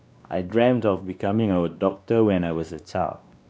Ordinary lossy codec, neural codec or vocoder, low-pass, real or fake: none; codec, 16 kHz, 2 kbps, X-Codec, WavLM features, trained on Multilingual LibriSpeech; none; fake